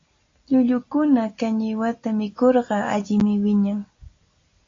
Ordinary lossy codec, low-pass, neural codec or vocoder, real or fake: AAC, 32 kbps; 7.2 kHz; none; real